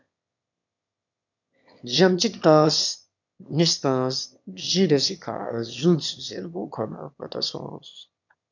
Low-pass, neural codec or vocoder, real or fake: 7.2 kHz; autoencoder, 22.05 kHz, a latent of 192 numbers a frame, VITS, trained on one speaker; fake